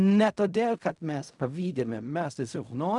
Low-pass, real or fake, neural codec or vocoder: 10.8 kHz; fake; codec, 16 kHz in and 24 kHz out, 0.4 kbps, LongCat-Audio-Codec, fine tuned four codebook decoder